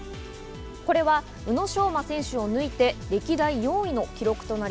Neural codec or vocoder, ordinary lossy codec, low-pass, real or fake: none; none; none; real